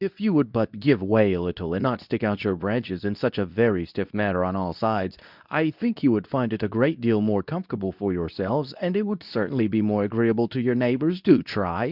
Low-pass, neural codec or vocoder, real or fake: 5.4 kHz; codec, 24 kHz, 0.9 kbps, WavTokenizer, medium speech release version 2; fake